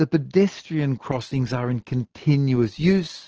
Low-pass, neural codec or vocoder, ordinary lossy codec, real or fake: 7.2 kHz; none; Opus, 16 kbps; real